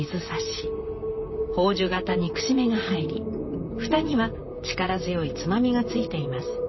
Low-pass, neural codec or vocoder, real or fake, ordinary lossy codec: 7.2 kHz; vocoder, 44.1 kHz, 128 mel bands, Pupu-Vocoder; fake; MP3, 24 kbps